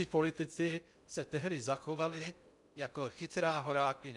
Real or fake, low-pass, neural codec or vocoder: fake; 10.8 kHz; codec, 16 kHz in and 24 kHz out, 0.6 kbps, FocalCodec, streaming, 2048 codes